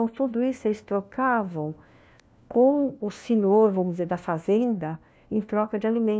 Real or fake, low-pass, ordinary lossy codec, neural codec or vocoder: fake; none; none; codec, 16 kHz, 1 kbps, FunCodec, trained on LibriTTS, 50 frames a second